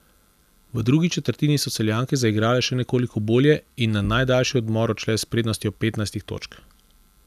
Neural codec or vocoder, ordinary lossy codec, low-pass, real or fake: none; none; 14.4 kHz; real